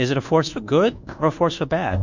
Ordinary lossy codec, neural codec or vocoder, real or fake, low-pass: Opus, 64 kbps; codec, 24 kHz, 1.2 kbps, DualCodec; fake; 7.2 kHz